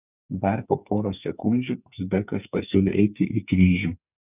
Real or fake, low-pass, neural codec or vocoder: fake; 3.6 kHz; codec, 44.1 kHz, 2.6 kbps, SNAC